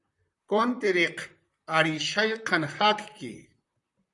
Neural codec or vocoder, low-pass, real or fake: vocoder, 44.1 kHz, 128 mel bands, Pupu-Vocoder; 10.8 kHz; fake